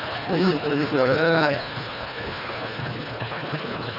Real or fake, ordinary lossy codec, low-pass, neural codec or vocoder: fake; none; 5.4 kHz; codec, 24 kHz, 1.5 kbps, HILCodec